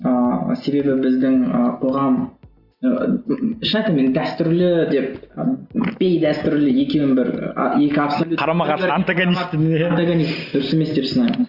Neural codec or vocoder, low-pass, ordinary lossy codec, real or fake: none; 5.4 kHz; Opus, 64 kbps; real